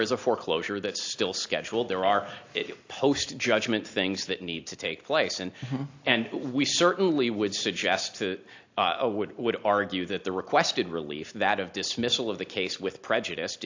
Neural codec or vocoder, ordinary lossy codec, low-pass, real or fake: none; AAC, 48 kbps; 7.2 kHz; real